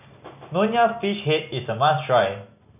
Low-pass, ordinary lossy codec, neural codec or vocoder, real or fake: 3.6 kHz; none; none; real